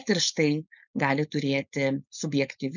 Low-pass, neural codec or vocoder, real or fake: 7.2 kHz; codec, 16 kHz, 4.8 kbps, FACodec; fake